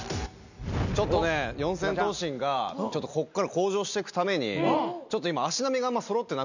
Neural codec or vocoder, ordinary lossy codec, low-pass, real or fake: none; none; 7.2 kHz; real